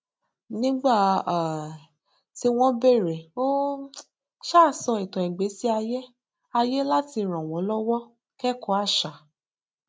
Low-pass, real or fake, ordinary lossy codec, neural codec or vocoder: none; real; none; none